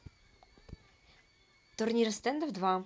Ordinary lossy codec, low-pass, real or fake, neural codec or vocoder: none; none; real; none